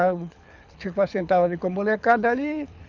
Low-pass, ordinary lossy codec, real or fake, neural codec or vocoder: 7.2 kHz; none; fake; codec, 24 kHz, 6 kbps, HILCodec